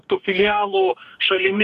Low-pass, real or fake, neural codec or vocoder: 14.4 kHz; fake; codec, 44.1 kHz, 2.6 kbps, DAC